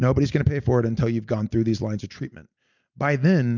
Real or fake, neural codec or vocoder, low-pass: real; none; 7.2 kHz